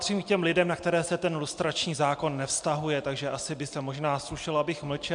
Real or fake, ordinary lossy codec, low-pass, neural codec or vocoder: real; MP3, 64 kbps; 9.9 kHz; none